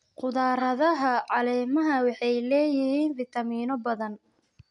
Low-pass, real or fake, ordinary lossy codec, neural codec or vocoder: 10.8 kHz; real; MP3, 64 kbps; none